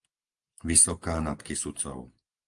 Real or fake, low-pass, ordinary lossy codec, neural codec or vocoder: real; 10.8 kHz; Opus, 32 kbps; none